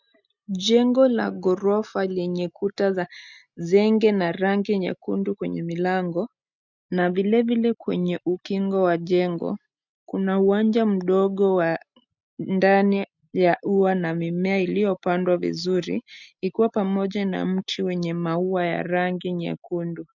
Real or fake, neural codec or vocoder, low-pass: real; none; 7.2 kHz